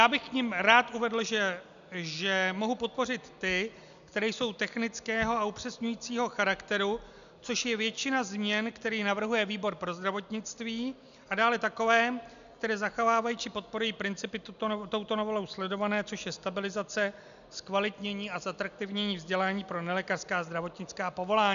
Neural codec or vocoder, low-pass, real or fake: none; 7.2 kHz; real